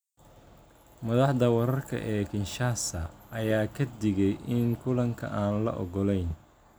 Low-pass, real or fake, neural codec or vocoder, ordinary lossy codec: none; real; none; none